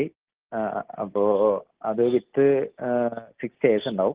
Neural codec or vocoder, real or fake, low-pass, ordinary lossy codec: none; real; 3.6 kHz; Opus, 16 kbps